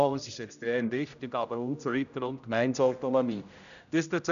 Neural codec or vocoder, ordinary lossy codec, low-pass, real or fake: codec, 16 kHz, 0.5 kbps, X-Codec, HuBERT features, trained on general audio; none; 7.2 kHz; fake